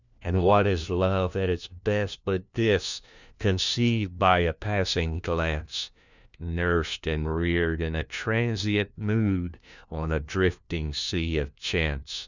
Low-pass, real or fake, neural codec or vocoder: 7.2 kHz; fake; codec, 16 kHz, 1 kbps, FunCodec, trained on LibriTTS, 50 frames a second